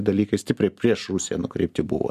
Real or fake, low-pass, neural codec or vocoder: real; 14.4 kHz; none